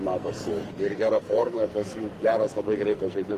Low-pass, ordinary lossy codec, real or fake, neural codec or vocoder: 14.4 kHz; Opus, 24 kbps; fake; codec, 44.1 kHz, 2.6 kbps, SNAC